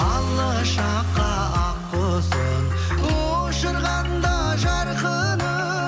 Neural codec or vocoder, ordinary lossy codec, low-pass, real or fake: none; none; none; real